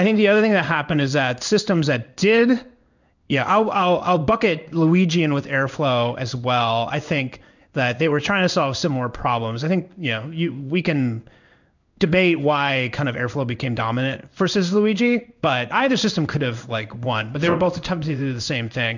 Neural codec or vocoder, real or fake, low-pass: codec, 16 kHz in and 24 kHz out, 1 kbps, XY-Tokenizer; fake; 7.2 kHz